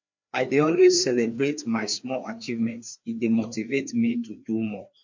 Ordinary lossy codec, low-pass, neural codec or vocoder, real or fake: MP3, 48 kbps; 7.2 kHz; codec, 16 kHz, 2 kbps, FreqCodec, larger model; fake